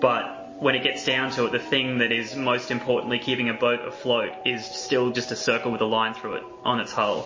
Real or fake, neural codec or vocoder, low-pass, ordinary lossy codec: real; none; 7.2 kHz; MP3, 32 kbps